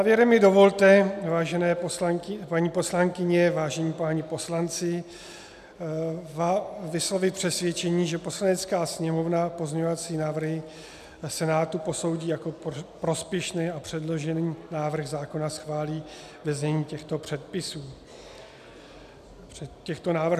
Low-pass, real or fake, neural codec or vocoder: 14.4 kHz; real; none